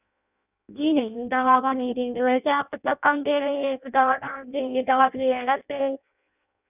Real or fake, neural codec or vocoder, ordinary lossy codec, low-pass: fake; codec, 16 kHz in and 24 kHz out, 0.6 kbps, FireRedTTS-2 codec; none; 3.6 kHz